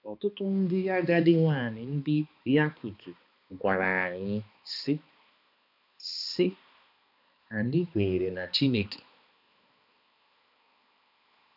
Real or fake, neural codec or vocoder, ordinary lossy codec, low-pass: fake; codec, 16 kHz, 2 kbps, X-Codec, HuBERT features, trained on balanced general audio; none; 5.4 kHz